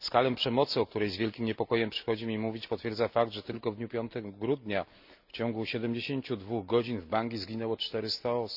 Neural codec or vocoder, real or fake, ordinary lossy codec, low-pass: none; real; none; 5.4 kHz